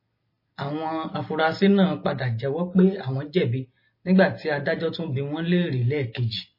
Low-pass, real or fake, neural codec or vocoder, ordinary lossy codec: 5.4 kHz; real; none; MP3, 24 kbps